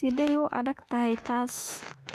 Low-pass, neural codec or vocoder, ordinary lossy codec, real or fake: 14.4 kHz; autoencoder, 48 kHz, 32 numbers a frame, DAC-VAE, trained on Japanese speech; none; fake